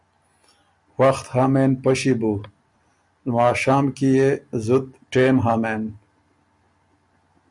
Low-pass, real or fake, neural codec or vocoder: 10.8 kHz; real; none